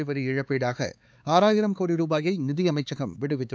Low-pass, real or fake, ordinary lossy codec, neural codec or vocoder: none; fake; none; codec, 16 kHz, 4 kbps, X-Codec, HuBERT features, trained on LibriSpeech